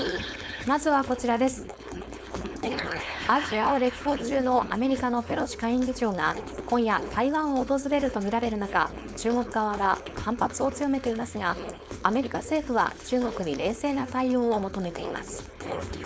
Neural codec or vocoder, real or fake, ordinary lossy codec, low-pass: codec, 16 kHz, 4.8 kbps, FACodec; fake; none; none